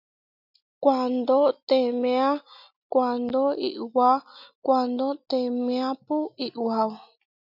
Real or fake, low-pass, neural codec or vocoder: real; 5.4 kHz; none